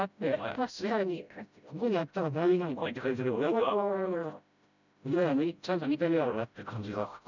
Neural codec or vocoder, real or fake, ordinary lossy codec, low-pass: codec, 16 kHz, 0.5 kbps, FreqCodec, smaller model; fake; none; 7.2 kHz